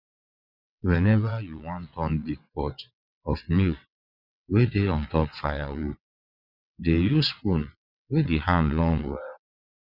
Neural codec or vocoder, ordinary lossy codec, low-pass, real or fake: vocoder, 22.05 kHz, 80 mel bands, WaveNeXt; none; 5.4 kHz; fake